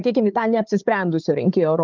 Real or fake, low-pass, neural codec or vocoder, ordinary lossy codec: fake; 7.2 kHz; codec, 16 kHz, 4 kbps, FunCodec, trained on Chinese and English, 50 frames a second; Opus, 32 kbps